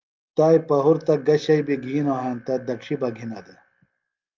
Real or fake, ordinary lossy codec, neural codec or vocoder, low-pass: real; Opus, 32 kbps; none; 7.2 kHz